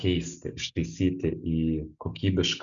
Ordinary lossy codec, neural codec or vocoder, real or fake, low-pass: MP3, 96 kbps; none; real; 7.2 kHz